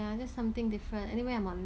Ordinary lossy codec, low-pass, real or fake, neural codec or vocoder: none; none; real; none